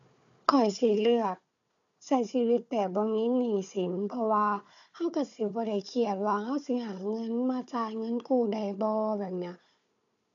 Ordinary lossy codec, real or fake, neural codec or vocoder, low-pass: none; fake; codec, 16 kHz, 4 kbps, FunCodec, trained on Chinese and English, 50 frames a second; 7.2 kHz